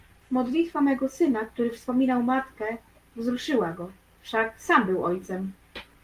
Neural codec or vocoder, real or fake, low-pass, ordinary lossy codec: none; real; 14.4 kHz; Opus, 32 kbps